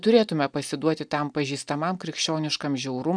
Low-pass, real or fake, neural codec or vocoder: 9.9 kHz; real; none